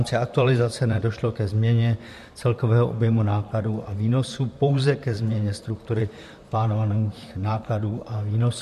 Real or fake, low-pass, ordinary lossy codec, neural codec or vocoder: fake; 14.4 kHz; MP3, 64 kbps; vocoder, 44.1 kHz, 128 mel bands, Pupu-Vocoder